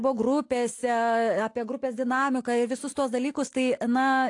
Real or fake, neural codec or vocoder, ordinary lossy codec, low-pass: real; none; AAC, 48 kbps; 10.8 kHz